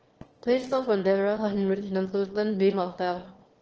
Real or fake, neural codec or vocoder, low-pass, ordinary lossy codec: fake; autoencoder, 22.05 kHz, a latent of 192 numbers a frame, VITS, trained on one speaker; 7.2 kHz; Opus, 16 kbps